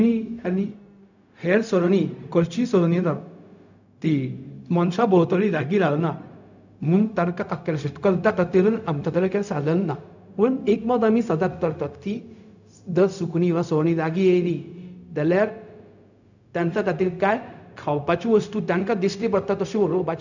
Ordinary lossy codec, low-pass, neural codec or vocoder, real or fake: none; 7.2 kHz; codec, 16 kHz, 0.4 kbps, LongCat-Audio-Codec; fake